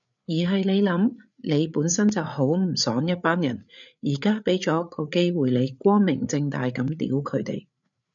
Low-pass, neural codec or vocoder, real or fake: 7.2 kHz; codec, 16 kHz, 8 kbps, FreqCodec, larger model; fake